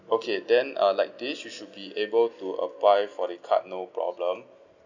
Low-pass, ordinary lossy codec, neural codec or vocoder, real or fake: 7.2 kHz; none; none; real